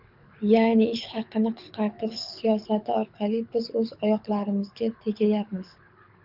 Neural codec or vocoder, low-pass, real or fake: codec, 24 kHz, 6 kbps, HILCodec; 5.4 kHz; fake